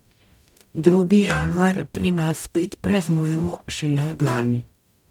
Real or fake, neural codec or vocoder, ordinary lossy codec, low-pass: fake; codec, 44.1 kHz, 0.9 kbps, DAC; none; 19.8 kHz